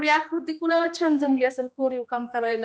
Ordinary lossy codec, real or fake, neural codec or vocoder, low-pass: none; fake; codec, 16 kHz, 1 kbps, X-Codec, HuBERT features, trained on general audio; none